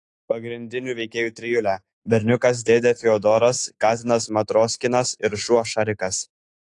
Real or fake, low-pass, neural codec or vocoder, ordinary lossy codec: fake; 10.8 kHz; autoencoder, 48 kHz, 128 numbers a frame, DAC-VAE, trained on Japanese speech; AAC, 48 kbps